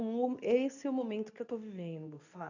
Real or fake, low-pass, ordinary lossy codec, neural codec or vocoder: fake; 7.2 kHz; none; codec, 24 kHz, 0.9 kbps, WavTokenizer, medium speech release version 2